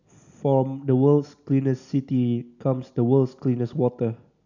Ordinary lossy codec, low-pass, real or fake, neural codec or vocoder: none; 7.2 kHz; real; none